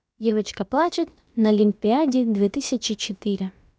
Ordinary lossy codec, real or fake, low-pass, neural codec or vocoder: none; fake; none; codec, 16 kHz, about 1 kbps, DyCAST, with the encoder's durations